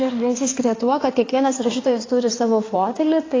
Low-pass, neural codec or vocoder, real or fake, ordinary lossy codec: 7.2 kHz; codec, 16 kHz in and 24 kHz out, 2.2 kbps, FireRedTTS-2 codec; fake; AAC, 32 kbps